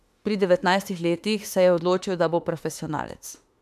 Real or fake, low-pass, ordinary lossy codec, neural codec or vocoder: fake; 14.4 kHz; MP3, 96 kbps; autoencoder, 48 kHz, 32 numbers a frame, DAC-VAE, trained on Japanese speech